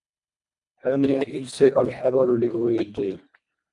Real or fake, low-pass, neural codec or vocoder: fake; 10.8 kHz; codec, 24 kHz, 1.5 kbps, HILCodec